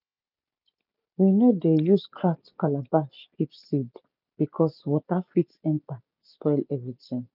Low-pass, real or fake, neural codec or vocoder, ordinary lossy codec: 5.4 kHz; real; none; none